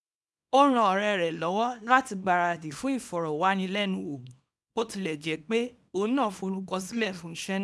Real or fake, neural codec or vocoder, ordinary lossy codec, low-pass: fake; codec, 24 kHz, 0.9 kbps, WavTokenizer, small release; none; none